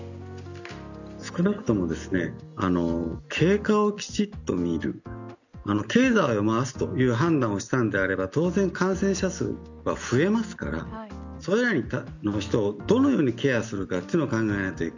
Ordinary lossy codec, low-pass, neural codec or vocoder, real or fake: none; 7.2 kHz; none; real